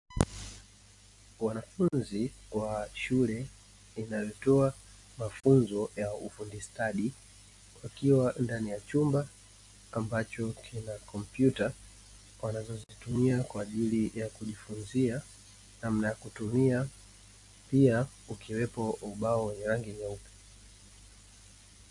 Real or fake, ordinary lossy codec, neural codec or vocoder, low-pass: real; AAC, 64 kbps; none; 10.8 kHz